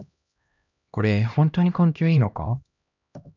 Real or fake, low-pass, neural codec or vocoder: fake; 7.2 kHz; codec, 16 kHz, 1 kbps, X-Codec, HuBERT features, trained on LibriSpeech